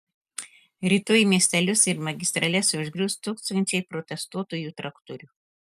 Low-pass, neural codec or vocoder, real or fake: 14.4 kHz; none; real